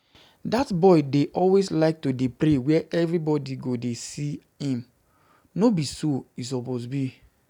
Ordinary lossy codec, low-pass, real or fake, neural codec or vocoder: none; 19.8 kHz; real; none